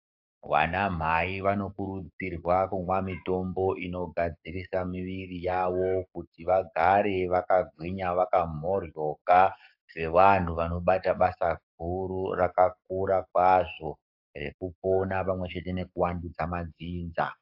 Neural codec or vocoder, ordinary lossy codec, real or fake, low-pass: codec, 44.1 kHz, 7.8 kbps, DAC; AAC, 48 kbps; fake; 5.4 kHz